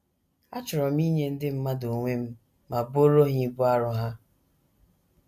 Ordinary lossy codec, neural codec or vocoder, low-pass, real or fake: none; none; 14.4 kHz; real